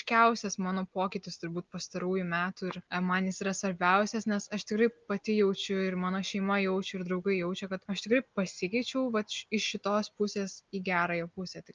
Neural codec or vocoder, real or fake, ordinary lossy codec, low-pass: none; real; Opus, 24 kbps; 7.2 kHz